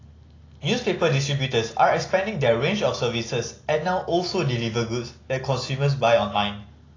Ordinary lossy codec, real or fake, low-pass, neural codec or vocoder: AAC, 32 kbps; real; 7.2 kHz; none